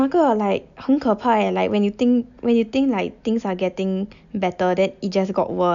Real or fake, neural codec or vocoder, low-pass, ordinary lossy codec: real; none; 7.2 kHz; none